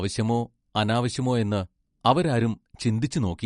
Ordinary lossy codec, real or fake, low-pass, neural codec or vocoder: MP3, 48 kbps; real; 10.8 kHz; none